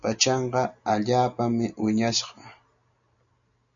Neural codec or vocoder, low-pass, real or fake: none; 7.2 kHz; real